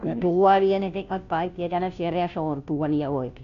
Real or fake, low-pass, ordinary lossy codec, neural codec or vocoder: fake; 7.2 kHz; none; codec, 16 kHz, 0.5 kbps, FunCodec, trained on Chinese and English, 25 frames a second